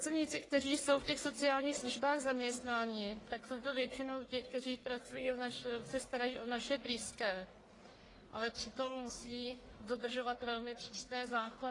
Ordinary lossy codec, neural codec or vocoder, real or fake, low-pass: AAC, 32 kbps; codec, 44.1 kHz, 1.7 kbps, Pupu-Codec; fake; 10.8 kHz